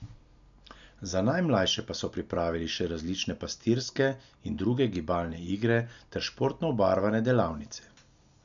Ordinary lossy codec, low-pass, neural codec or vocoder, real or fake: none; 7.2 kHz; none; real